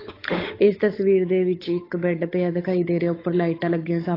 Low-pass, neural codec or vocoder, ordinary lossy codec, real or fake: 5.4 kHz; codec, 16 kHz, 8 kbps, FunCodec, trained on Chinese and English, 25 frames a second; AAC, 24 kbps; fake